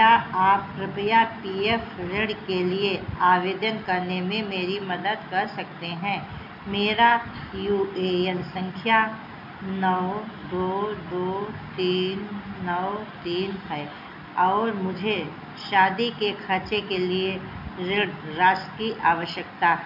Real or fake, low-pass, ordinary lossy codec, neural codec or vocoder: real; 5.4 kHz; none; none